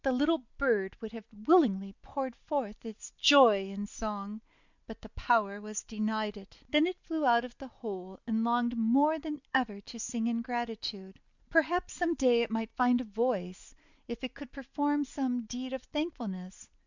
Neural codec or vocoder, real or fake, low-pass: none; real; 7.2 kHz